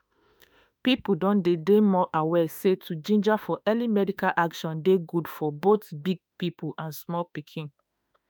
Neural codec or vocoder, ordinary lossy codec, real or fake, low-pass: autoencoder, 48 kHz, 32 numbers a frame, DAC-VAE, trained on Japanese speech; none; fake; none